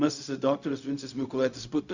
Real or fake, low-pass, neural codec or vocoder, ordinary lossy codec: fake; 7.2 kHz; codec, 16 kHz, 0.4 kbps, LongCat-Audio-Codec; Opus, 64 kbps